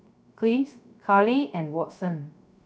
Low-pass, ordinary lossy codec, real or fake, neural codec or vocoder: none; none; fake; codec, 16 kHz, 0.3 kbps, FocalCodec